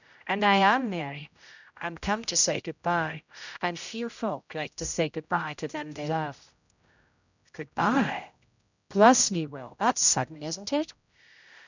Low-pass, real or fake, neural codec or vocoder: 7.2 kHz; fake; codec, 16 kHz, 0.5 kbps, X-Codec, HuBERT features, trained on general audio